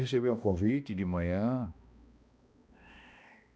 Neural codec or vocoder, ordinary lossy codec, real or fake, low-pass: codec, 16 kHz, 1 kbps, X-Codec, HuBERT features, trained on balanced general audio; none; fake; none